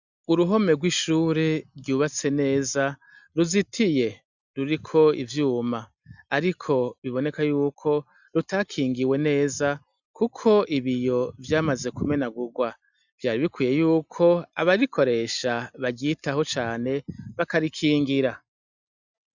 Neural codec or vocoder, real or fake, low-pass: none; real; 7.2 kHz